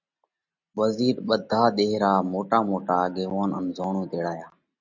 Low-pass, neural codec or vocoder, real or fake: 7.2 kHz; none; real